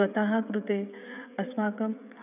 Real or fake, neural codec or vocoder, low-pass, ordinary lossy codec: fake; codec, 16 kHz, 16 kbps, FreqCodec, smaller model; 3.6 kHz; none